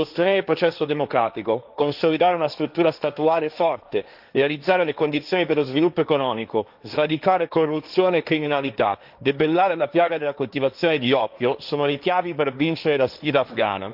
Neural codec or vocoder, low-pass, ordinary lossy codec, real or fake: codec, 16 kHz, 1.1 kbps, Voila-Tokenizer; 5.4 kHz; none; fake